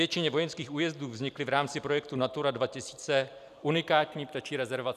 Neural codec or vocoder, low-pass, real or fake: vocoder, 44.1 kHz, 128 mel bands every 256 samples, BigVGAN v2; 14.4 kHz; fake